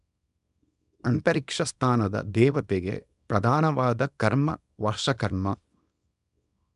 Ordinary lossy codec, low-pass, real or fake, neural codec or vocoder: none; 10.8 kHz; fake; codec, 24 kHz, 0.9 kbps, WavTokenizer, small release